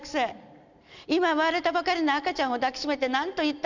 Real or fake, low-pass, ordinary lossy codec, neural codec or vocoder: real; 7.2 kHz; none; none